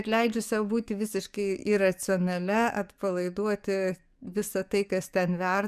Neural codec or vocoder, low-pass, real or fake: codec, 44.1 kHz, 7.8 kbps, DAC; 14.4 kHz; fake